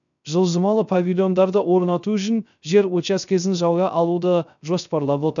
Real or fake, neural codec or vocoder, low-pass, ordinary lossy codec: fake; codec, 16 kHz, 0.3 kbps, FocalCodec; 7.2 kHz; none